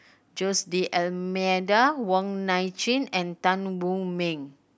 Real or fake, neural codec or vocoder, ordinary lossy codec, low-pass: real; none; none; none